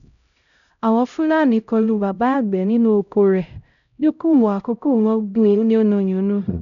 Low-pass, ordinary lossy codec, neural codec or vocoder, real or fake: 7.2 kHz; none; codec, 16 kHz, 0.5 kbps, X-Codec, HuBERT features, trained on LibriSpeech; fake